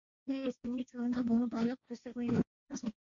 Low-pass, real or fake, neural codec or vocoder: 7.2 kHz; fake; codec, 16 kHz, 1.1 kbps, Voila-Tokenizer